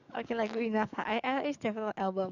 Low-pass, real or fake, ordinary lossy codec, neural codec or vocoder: 7.2 kHz; fake; none; codec, 44.1 kHz, 7.8 kbps, DAC